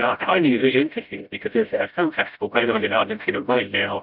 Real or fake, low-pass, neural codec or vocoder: fake; 5.4 kHz; codec, 16 kHz, 0.5 kbps, FreqCodec, smaller model